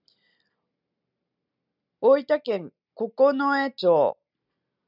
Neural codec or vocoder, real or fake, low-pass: none; real; 5.4 kHz